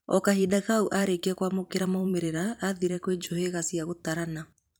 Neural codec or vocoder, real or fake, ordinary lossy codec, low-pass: vocoder, 44.1 kHz, 128 mel bands every 512 samples, BigVGAN v2; fake; none; none